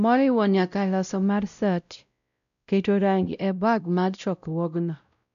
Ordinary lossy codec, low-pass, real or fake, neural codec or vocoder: none; 7.2 kHz; fake; codec, 16 kHz, 0.5 kbps, X-Codec, WavLM features, trained on Multilingual LibriSpeech